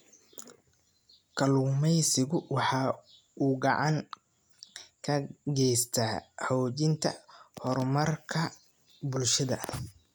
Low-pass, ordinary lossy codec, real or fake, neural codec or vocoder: none; none; real; none